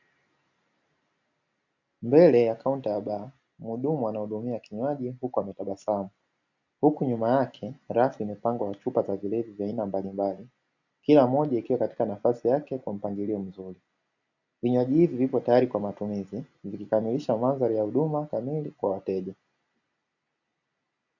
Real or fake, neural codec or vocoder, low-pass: real; none; 7.2 kHz